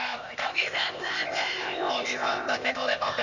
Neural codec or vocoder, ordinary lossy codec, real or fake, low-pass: codec, 16 kHz, 0.8 kbps, ZipCodec; none; fake; 7.2 kHz